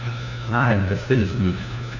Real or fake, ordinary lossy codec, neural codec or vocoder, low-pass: fake; none; codec, 16 kHz, 1 kbps, FunCodec, trained on LibriTTS, 50 frames a second; 7.2 kHz